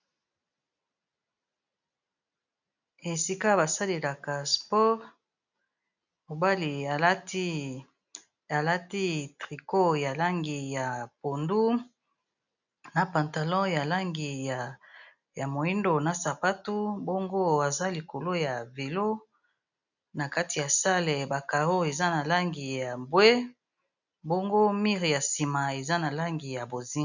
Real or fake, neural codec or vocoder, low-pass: real; none; 7.2 kHz